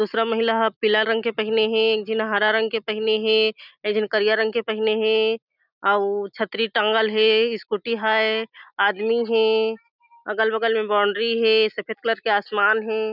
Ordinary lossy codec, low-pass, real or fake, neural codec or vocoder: none; 5.4 kHz; real; none